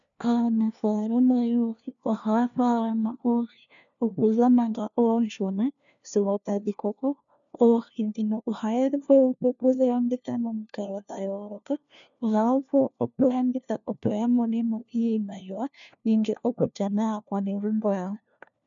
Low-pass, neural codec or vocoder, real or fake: 7.2 kHz; codec, 16 kHz, 1 kbps, FunCodec, trained on LibriTTS, 50 frames a second; fake